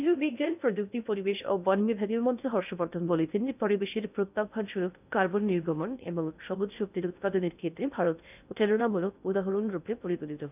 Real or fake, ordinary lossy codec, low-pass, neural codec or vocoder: fake; none; 3.6 kHz; codec, 16 kHz in and 24 kHz out, 0.6 kbps, FocalCodec, streaming, 2048 codes